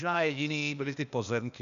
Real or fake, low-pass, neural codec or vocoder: fake; 7.2 kHz; codec, 16 kHz, 0.8 kbps, ZipCodec